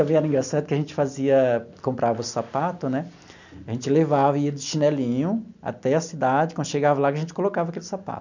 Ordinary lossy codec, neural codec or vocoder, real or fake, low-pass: none; none; real; 7.2 kHz